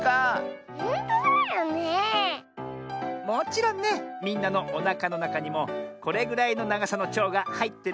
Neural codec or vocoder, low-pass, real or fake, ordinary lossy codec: none; none; real; none